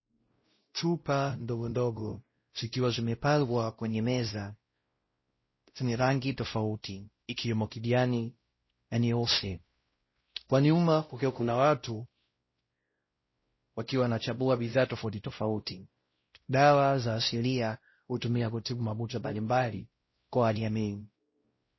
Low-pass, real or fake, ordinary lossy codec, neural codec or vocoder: 7.2 kHz; fake; MP3, 24 kbps; codec, 16 kHz, 0.5 kbps, X-Codec, WavLM features, trained on Multilingual LibriSpeech